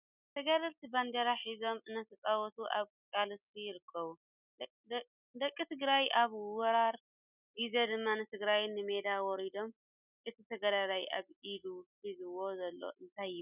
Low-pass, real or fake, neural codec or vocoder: 3.6 kHz; real; none